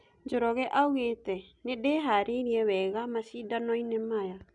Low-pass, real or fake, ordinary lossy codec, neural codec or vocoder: 10.8 kHz; real; none; none